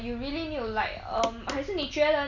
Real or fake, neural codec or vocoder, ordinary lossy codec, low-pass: real; none; none; 7.2 kHz